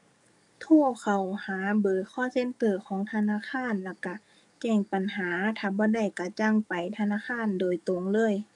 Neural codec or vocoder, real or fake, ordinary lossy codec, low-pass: codec, 44.1 kHz, 7.8 kbps, DAC; fake; none; 10.8 kHz